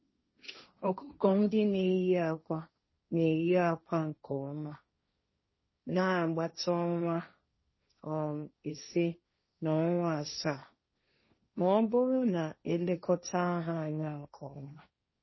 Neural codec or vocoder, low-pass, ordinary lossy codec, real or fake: codec, 16 kHz, 1.1 kbps, Voila-Tokenizer; 7.2 kHz; MP3, 24 kbps; fake